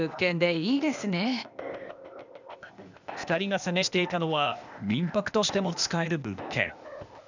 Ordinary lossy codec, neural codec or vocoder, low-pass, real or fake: none; codec, 16 kHz, 0.8 kbps, ZipCodec; 7.2 kHz; fake